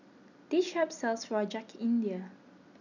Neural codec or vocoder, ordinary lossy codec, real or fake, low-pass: none; none; real; 7.2 kHz